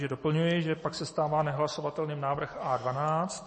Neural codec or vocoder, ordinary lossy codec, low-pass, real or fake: none; MP3, 32 kbps; 10.8 kHz; real